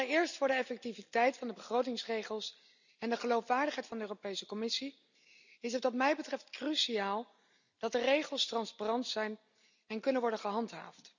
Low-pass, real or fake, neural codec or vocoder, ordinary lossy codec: 7.2 kHz; real; none; none